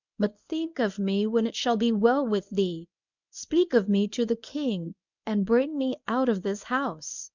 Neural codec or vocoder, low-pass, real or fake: codec, 24 kHz, 0.9 kbps, WavTokenizer, medium speech release version 1; 7.2 kHz; fake